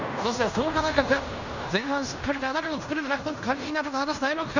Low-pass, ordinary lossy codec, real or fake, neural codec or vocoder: 7.2 kHz; none; fake; codec, 16 kHz in and 24 kHz out, 0.9 kbps, LongCat-Audio-Codec, fine tuned four codebook decoder